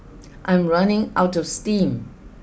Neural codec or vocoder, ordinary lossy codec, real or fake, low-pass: none; none; real; none